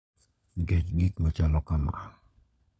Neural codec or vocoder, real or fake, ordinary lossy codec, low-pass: codec, 16 kHz, 16 kbps, FunCodec, trained on LibriTTS, 50 frames a second; fake; none; none